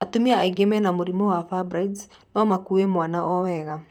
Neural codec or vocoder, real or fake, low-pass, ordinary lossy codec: vocoder, 44.1 kHz, 128 mel bands, Pupu-Vocoder; fake; 19.8 kHz; none